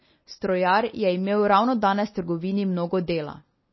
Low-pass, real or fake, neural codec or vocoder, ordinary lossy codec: 7.2 kHz; real; none; MP3, 24 kbps